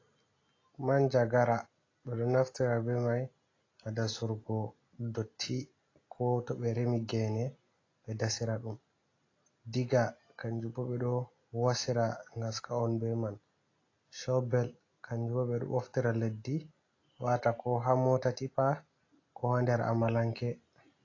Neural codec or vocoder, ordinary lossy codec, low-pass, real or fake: none; AAC, 32 kbps; 7.2 kHz; real